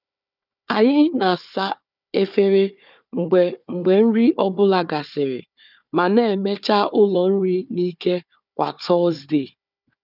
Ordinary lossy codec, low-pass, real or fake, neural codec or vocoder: none; 5.4 kHz; fake; codec, 16 kHz, 4 kbps, FunCodec, trained on Chinese and English, 50 frames a second